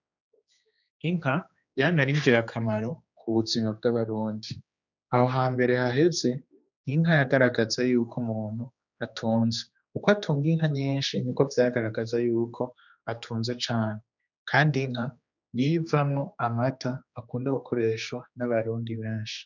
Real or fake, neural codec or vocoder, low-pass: fake; codec, 16 kHz, 2 kbps, X-Codec, HuBERT features, trained on general audio; 7.2 kHz